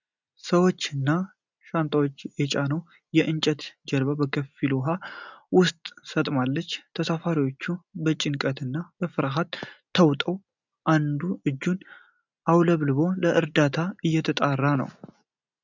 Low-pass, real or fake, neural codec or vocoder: 7.2 kHz; real; none